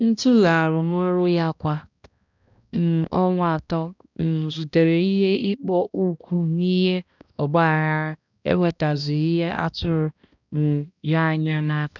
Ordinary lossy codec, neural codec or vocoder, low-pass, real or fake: none; codec, 16 kHz, 1 kbps, X-Codec, HuBERT features, trained on balanced general audio; 7.2 kHz; fake